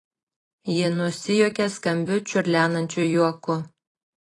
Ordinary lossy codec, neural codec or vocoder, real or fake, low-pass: AAC, 32 kbps; vocoder, 44.1 kHz, 128 mel bands every 256 samples, BigVGAN v2; fake; 10.8 kHz